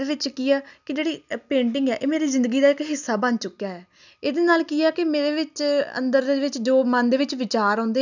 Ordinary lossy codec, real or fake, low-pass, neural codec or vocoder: none; real; 7.2 kHz; none